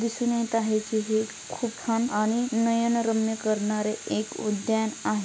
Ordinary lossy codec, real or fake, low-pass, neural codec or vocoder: none; real; none; none